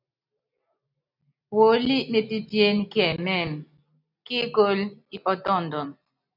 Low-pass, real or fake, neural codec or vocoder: 5.4 kHz; real; none